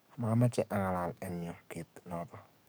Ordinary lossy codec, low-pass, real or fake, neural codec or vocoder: none; none; fake; codec, 44.1 kHz, 7.8 kbps, DAC